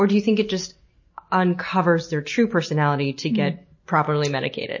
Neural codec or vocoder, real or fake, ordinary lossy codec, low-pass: none; real; MP3, 32 kbps; 7.2 kHz